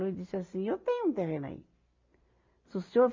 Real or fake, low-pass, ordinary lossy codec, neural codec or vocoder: real; 7.2 kHz; MP3, 48 kbps; none